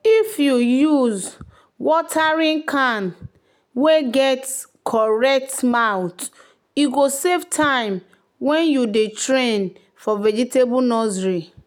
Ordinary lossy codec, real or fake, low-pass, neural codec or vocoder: none; real; none; none